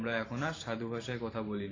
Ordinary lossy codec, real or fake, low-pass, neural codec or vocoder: AAC, 32 kbps; fake; 7.2 kHz; vocoder, 44.1 kHz, 128 mel bands every 512 samples, BigVGAN v2